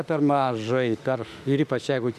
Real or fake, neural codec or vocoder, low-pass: fake; autoencoder, 48 kHz, 32 numbers a frame, DAC-VAE, trained on Japanese speech; 14.4 kHz